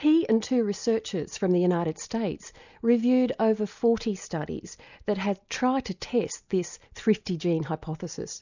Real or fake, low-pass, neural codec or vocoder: real; 7.2 kHz; none